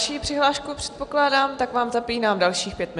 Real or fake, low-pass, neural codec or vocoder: real; 10.8 kHz; none